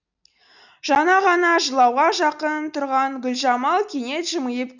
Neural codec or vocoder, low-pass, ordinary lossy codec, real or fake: none; 7.2 kHz; none; real